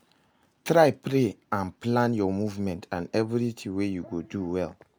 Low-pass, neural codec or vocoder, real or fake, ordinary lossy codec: none; none; real; none